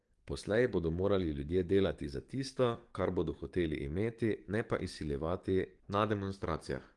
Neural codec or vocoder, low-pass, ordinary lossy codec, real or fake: codec, 44.1 kHz, 7.8 kbps, DAC; 10.8 kHz; Opus, 24 kbps; fake